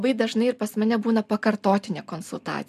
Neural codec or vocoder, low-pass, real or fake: none; 14.4 kHz; real